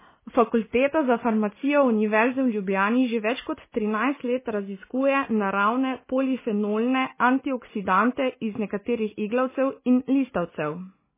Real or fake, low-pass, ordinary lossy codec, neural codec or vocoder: real; 3.6 kHz; MP3, 16 kbps; none